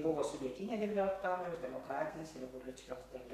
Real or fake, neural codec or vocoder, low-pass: fake; codec, 32 kHz, 1.9 kbps, SNAC; 14.4 kHz